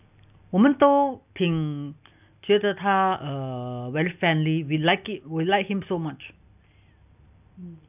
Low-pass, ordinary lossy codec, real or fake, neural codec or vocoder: 3.6 kHz; none; real; none